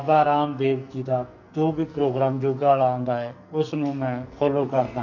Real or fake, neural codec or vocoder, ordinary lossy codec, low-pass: fake; codec, 44.1 kHz, 2.6 kbps, SNAC; none; 7.2 kHz